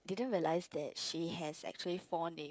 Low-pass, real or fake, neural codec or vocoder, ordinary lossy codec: none; fake; codec, 16 kHz, 16 kbps, FreqCodec, smaller model; none